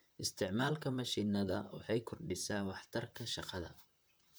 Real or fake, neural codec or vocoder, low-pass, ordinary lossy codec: fake; vocoder, 44.1 kHz, 128 mel bands, Pupu-Vocoder; none; none